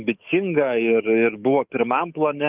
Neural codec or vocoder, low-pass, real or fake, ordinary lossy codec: codec, 44.1 kHz, 7.8 kbps, DAC; 3.6 kHz; fake; Opus, 32 kbps